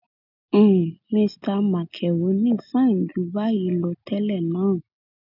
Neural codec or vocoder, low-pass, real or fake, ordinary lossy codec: none; 5.4 kHz; real; none